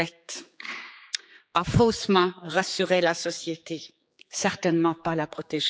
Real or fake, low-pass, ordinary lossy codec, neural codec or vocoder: fake; none; none; codec, 16 kHz, 4 kbps, X-Codec, HuBERT features, trained on general audio